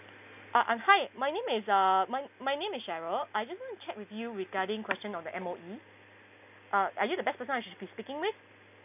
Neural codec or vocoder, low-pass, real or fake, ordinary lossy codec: none; 3.6 kHz; real; none